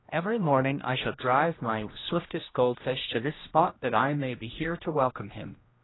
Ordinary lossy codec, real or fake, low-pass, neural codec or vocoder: AAC, 16 kbps; fake; 7.2 kHz; codec, 16 kHz, 1 kbps, X-Codec, HuBERT features, trained on general audio